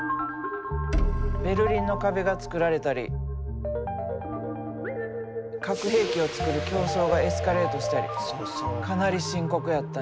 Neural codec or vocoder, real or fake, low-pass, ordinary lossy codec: none; real; none; none